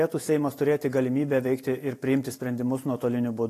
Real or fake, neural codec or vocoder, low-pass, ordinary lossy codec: real; none; 14.4 kHz; AAC, 48 kbps